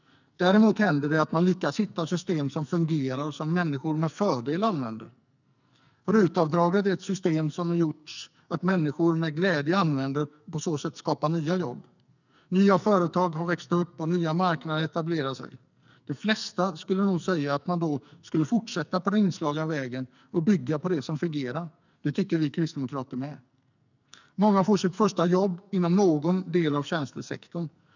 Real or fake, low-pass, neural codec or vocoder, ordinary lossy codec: fake; 7.2 kHz; codec, 44.1 kHz, 2.6 kbps, SNAC; none